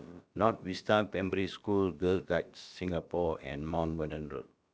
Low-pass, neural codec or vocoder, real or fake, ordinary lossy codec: none; codec, 16 kHz, about 1 kbps, DyCAST, with the encoder's durations; fake; none